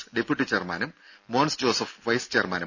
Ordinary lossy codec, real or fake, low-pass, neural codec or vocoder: none; real; 7.2 kHz; none